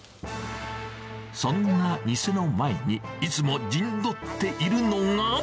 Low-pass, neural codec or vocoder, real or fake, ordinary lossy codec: none; none; real; none